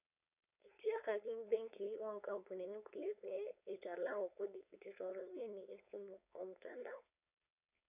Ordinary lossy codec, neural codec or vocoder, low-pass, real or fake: none; codec, 16 kHz, 4.8 kbps, FACodec; 3.6 kHz; fake